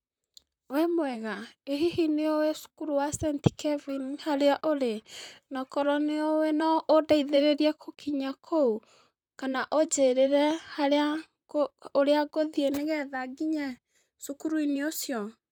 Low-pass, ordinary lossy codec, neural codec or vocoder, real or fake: 19.8 kHz; none; vocoder, 44.1 kHz, 128 mel bands, Pupu-Vocoder; fake